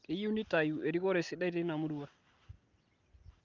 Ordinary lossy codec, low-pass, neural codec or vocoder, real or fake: Opus, 32 kbps; 7.2 kHz; none; real